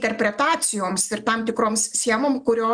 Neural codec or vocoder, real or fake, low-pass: none; real; 9.9 kHz